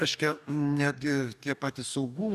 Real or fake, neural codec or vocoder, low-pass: fake; codec, 44.1 kHz, 2.6 kbps, DAC; 14.4 kHz